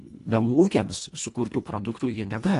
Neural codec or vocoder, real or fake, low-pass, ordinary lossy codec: codec, 24 kHz, 1.5 kbps, HILCodec; fake; 10.8 kHz; AAC, 48 kbps